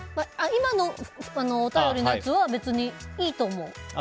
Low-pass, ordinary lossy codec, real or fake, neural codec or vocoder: none; none; real; none